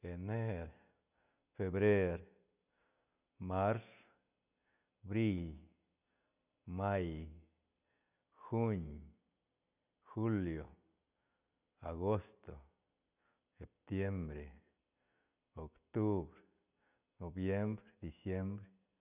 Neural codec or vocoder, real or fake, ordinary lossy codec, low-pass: none; real; none; 3.6 kHz